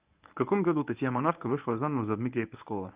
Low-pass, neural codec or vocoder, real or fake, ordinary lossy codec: 3.6 kHz; codec, 24 kHz, 0.9 kbps, WavTokenizer, medium speech release version 1; fake; Opus, 24 kbps